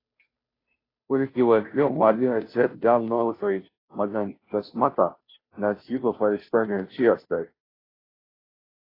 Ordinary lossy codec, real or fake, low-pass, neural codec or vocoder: AAC, 24 kbps; fake; 5.4 kHz; codec, 16 kHz, 0.5 kbps, FunCodec, trained on Chinese and English, 25 frames a second